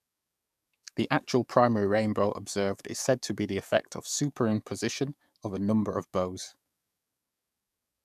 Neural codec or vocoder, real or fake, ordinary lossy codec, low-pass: codec, 44.1 kHz, 7.8 kbps, DAC; fake; none; 14.4 kHz